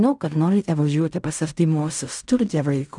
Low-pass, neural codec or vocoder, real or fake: 10.8 kHz; codec, 16 kHz in and 24 kHz out, 0.4 kbps, LongCat-Audio-Codec, fine tuned four codebook decoder; fake